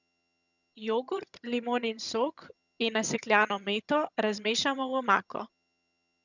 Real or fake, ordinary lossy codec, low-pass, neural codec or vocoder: fake; none; 7.2 kHz; vocoder, 22.05 kHz, 80 mel bands, HiFi-GAN